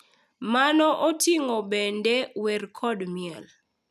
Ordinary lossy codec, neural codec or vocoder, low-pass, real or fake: none; none; 14.4 kHz; real